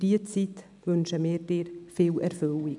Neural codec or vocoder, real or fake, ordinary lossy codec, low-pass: none; real; none; 10.8 kHz